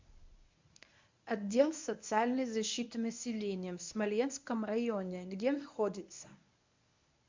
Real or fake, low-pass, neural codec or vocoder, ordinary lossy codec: fake; 7.2 kHz; codec, 24 kHz, 0.9 kbps, WavTokenizer, medium speech release version 1; MP3, 64 kbps